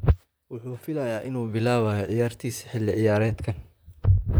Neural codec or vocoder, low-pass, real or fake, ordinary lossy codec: vocoder, 44.1 kHz, 128 mel bands, Pupu-Vocoder; none; fake; none